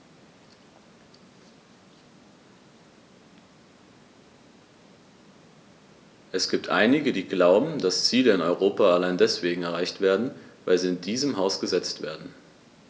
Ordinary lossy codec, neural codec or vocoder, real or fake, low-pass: none; none; real; none